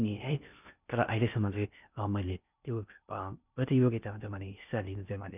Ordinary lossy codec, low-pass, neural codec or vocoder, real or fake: none; 3.6 kHz; codec, 16 kHz in and 24 kHz out, 0.6 kbps, FocalCodec, streaming, 4096 codes; fake